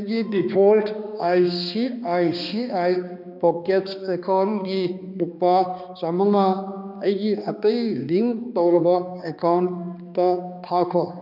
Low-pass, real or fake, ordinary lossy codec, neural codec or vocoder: 5.4 kHz; fake; none; codec, 16 kHz, 2 kbps, X-Codec, HuBERT features, trained on balanced general audio